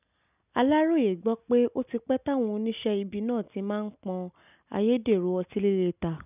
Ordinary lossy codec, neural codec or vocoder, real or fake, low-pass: none; none; real; 3.6 kHz